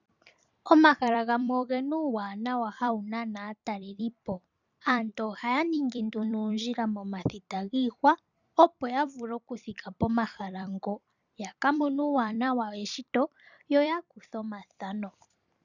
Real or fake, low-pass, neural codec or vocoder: fake; 7.2 kHz; vocoder, 44.1 kHz, 128 mel bands every 256 samples, BigVGAN v2